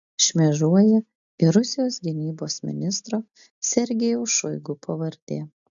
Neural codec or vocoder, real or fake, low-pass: none; real; 7.2 kHz